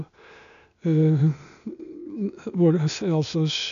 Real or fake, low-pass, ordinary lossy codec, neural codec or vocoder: fake; 7.2 kHz; none; codec, 16 kHz, 0.8 kbps, ZipCodec